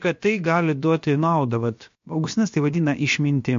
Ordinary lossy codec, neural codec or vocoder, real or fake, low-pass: MP3, 48 kbps; codec, 16 kHz, about 1 kbps, DyCAST, with the encoder's durations; fake; 7.2 kHz